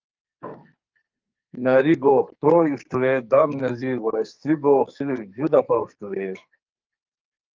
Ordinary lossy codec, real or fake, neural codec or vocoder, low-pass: Opus, 24 kbps; fake; codec, 44.1 kHz, 2.6 kbps, SNAC; 7.2 kHz